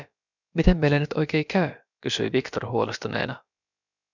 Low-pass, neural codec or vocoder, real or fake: 7.2 kHz; codec, 16 kHz, about 1 kbps, DyCAST, with the encoder's durations; fake